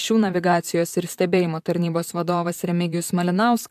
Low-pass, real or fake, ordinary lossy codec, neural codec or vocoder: 14.4 kHz; fake; AAC, 96 kbps; vocoder, 44.1 kHz, 128 mel bands, Pupu-Vocoder